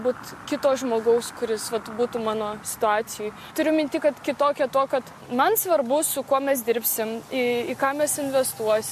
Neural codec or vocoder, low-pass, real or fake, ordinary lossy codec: none; 14.4 kHz; real; MP3, 64 kbps